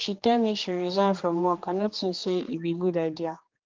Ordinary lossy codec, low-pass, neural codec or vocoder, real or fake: Opus, 32 kbps; 7.2 kHz; codec, 16 kHz, 2 kbps, X-Codec, HuBERT features, trained on general audio; fake